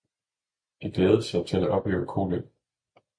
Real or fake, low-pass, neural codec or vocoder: real; 9.9 kHz; none